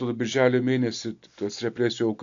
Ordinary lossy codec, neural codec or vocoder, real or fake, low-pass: AAC, 64 kbps; none; real; 7.2 kHz